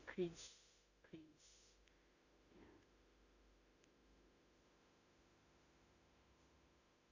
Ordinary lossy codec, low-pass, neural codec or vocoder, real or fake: none; 7.2 kHz; autoencoder, 48 kHz, 32 numbers a frame, DAC-VAE, trained on Japanese speech; fake